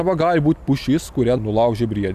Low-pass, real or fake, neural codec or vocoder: 14.4 kHz; real; none